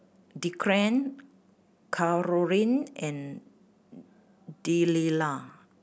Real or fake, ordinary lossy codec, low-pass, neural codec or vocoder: real; none; none; none